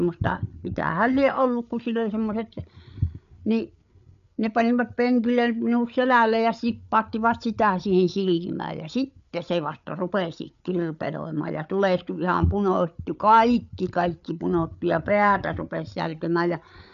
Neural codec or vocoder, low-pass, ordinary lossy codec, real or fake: codec, 16 kHz, 8 kbps, FreqCodec, larger model; 7.2 kHz; none; fake